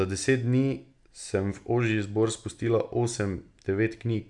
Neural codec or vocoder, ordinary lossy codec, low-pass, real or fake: none; none; 10.8 kHz; real